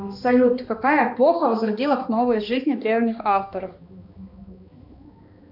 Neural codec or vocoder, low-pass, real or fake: codec, 16 kHz, 2 kbps, X-Codec, HuBERT features, trained on balanced general audio; 5.4 kHz; fake